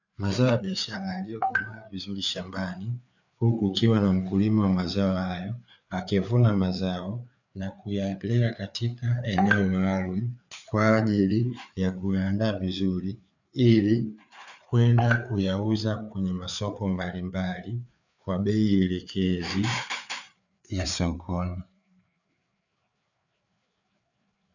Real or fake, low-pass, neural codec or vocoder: fake; 7.2 kHz; codec, 16 kHz, 4 kbps, FreqCodec, larger model